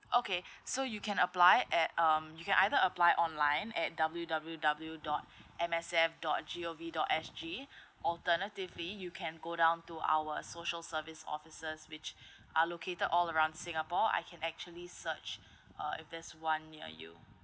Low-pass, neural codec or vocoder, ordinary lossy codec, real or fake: none; none; none; real